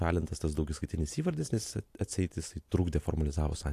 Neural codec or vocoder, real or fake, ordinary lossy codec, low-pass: none; real; AAC, 64 kbps; 14.4 kHz